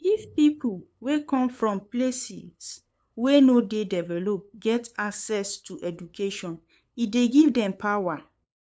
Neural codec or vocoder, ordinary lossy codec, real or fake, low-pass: codec, 16 kHz, 8 kbps, FunCodec, trained on LibriTTS, 25 frames a second; none; fake; none